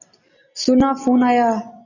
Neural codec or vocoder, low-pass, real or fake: none; 7.2 kHz; real